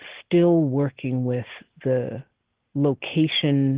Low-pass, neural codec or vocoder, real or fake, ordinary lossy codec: 3.6 kHz; none; real; Opus, 16 kbps